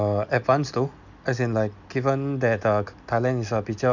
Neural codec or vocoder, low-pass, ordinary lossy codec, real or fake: none; 7.2 kHz; none; real